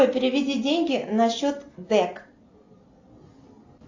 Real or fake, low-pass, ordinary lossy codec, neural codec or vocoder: real; 7.2 kHz; AAC, 48 kbps; none